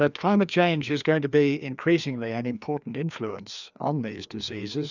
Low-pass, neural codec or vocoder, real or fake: 7.2 kHz; codec, 16 kHz, 2 kbps, FreqCodec, larger model; fake